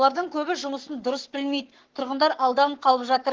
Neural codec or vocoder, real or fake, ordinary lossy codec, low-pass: codec, 44.1 kHz, 7.8 kbps, Pupu-Codec; fake; Opus, 16 kbps; 7.2 kHz